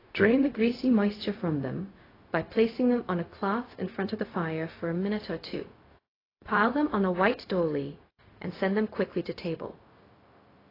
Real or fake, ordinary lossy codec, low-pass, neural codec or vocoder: fake; AAC, 24 kbps; 5.4 kHz; codec, 16 kHz, 0.4 kbps, LongCat-Audio-Codec